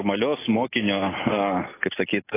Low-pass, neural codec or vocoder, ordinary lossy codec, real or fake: 3.6 kHz; none; AAC, 16 kbps; real